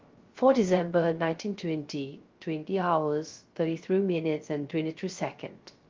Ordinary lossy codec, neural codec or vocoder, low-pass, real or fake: Opus, 32 kbps; codec, 16 kHz, 0.3 kbps, FocalCodec; 7.2 kHz; fake